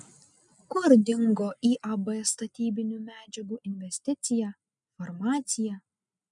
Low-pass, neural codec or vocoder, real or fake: 10.8 kHz; none; real